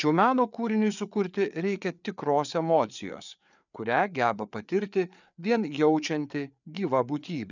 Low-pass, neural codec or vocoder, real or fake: 7.2 kHz; codec, 16 kHz, 4 kbps, FreqCodec, larger model; fake